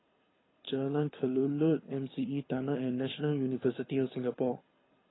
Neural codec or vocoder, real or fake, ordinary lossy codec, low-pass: codec, 24 kHz, 6 kbps, HILCodec; fake; AAC, 16 kbps; 7.2 kHz